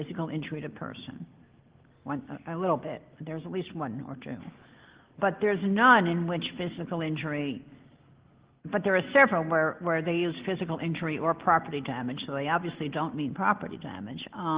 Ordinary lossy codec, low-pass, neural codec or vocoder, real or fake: Opus, 16 kbps; 3.6 kHz; none; real